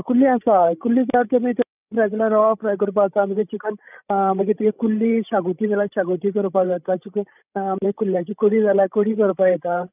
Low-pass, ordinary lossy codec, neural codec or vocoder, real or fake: 3.6 kHz; none; codec, 16 kHz, 16 kbps, FreqCodec, larger model; fake